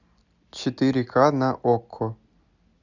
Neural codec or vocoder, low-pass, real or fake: none; 7.2 kHz; real